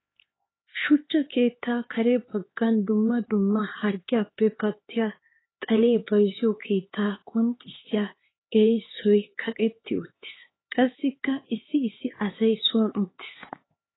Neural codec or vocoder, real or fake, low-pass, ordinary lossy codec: codec, 16 kHz, 4 kbps, X-Codec, HuBERT features, trained on LibriSpeech; fake; 7.2 kHz; AAC, 16 kbps